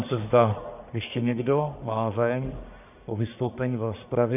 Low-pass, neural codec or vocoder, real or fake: 3.6 kHz; codec, 44.1 kHz, 1.7 kbps, Pupu-Codec; fake